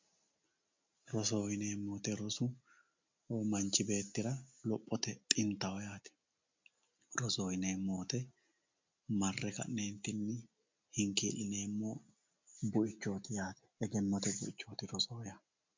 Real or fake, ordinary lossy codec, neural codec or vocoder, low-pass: real; MP3, 64 kbps; none; 7.2 kHz